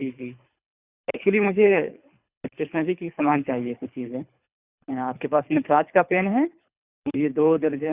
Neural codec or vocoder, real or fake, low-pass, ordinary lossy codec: codec, 24 kHz, 3 kbps, HILCodec; fake; 3.6 kHz; Opus, 64 kbps